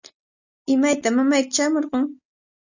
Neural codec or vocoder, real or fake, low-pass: none; real; 7.2 kHz